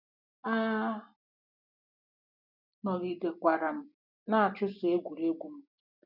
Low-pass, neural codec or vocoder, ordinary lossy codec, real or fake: 5.4 kHz; none; none; real